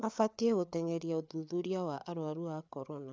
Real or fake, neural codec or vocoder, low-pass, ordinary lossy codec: fake; codec, 16 kHz, 8 kbps, FreqCodec, larger model; none; none